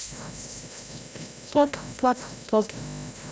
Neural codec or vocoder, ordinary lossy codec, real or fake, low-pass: codec, 16 kHz, 0.5 kbps, FreqCodec, larger model; none; fake; none